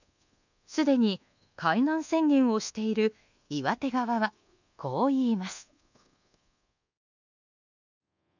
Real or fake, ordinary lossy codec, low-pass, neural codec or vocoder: fake; none; 7.2 kHz; codec, 24 kHz, 1.2 kbps, DualCodec